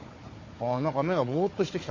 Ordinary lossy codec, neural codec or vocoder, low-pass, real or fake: MP3, 32 kbps; codec, 16 kHz, 4 kbps, FunCodec, trained on Chinese and English, 50 frames a second; 7.2 kHz; fake